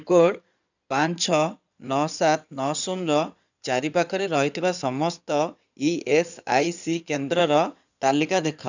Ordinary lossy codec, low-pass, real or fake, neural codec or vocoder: none; 7.2 kHz; fake; codec, 16 kHz in and 24 kHz out, 2.2 kbps, FireRedTTS-2 codec